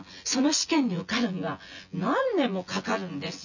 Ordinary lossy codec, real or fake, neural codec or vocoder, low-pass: none; fake; vocoder, 24 kHz, 100 mel bands, Vocos; 7.2 kHz